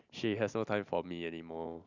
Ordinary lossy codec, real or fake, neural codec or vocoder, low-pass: none; real; none; 7.2 kHz